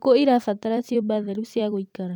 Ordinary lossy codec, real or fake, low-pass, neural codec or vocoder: none; fake; 19.8 kHz; vocoder, 44.1 kHz, 128 mel bands every 256 samples, BigVGAN v2